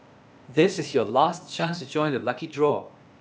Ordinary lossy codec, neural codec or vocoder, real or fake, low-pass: none; codec, 16 kHz, 0.8 kbps, ZipCodec; fake; none